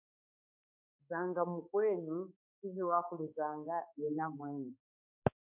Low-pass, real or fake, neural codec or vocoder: 3.6 kHz; fake; codec, 16 kHz, 2 kbps, X-Codec, HuBERT features, trained on balanced general audio